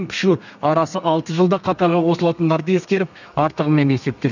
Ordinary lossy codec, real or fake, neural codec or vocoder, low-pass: none; fake; codec, 32 kHz, 1.9 kbps, SNAC; 7.2 kHz